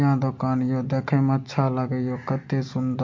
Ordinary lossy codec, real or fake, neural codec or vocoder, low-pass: MP3, 48 kbps; real; none; 7.2 kHz